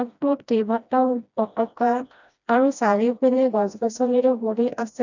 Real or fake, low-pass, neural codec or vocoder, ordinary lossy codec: fake; 7.2 kHz; codec, 16 kHz, 1 kbps, FreqCodec, smaller model; none